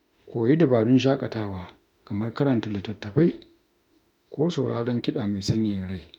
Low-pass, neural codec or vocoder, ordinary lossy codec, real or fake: 19.8 kHz; autoencoder, 48 kHz, 32 numbers a frame, DAC-VAE, trained on Japanese speech; none; fake